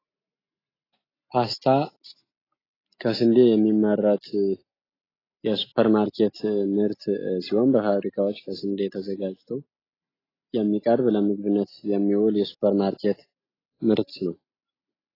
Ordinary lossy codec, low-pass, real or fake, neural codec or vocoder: AAC, 24 kbps; 5.4 kHz; real; none